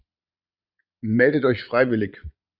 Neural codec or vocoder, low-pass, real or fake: vocoder, 22.05 kHz, 80 mel bands, Vocos; 5.4 kHz; fake